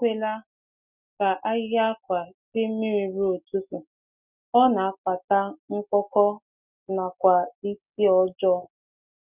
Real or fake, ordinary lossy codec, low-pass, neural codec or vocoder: real; none; 3.6 kHz; none